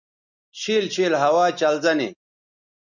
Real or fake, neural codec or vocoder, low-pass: real; none; 7.2 kHz